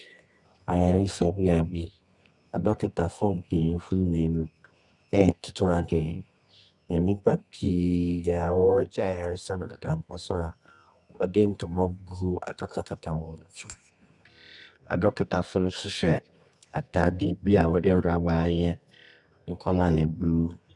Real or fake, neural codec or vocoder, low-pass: fake; codec, 24 kHz, 0.9 kbps, WavTokenizer, medium music audio release; 10.8 kHz